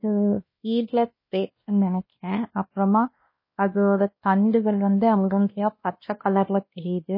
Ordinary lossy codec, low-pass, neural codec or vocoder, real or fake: MP3, 24 kbps; 5.4 kHz; codec, 16 kHz, 1 kbps, X-Codec, WavLM features, trained on Multilingual LibriSpeech; fake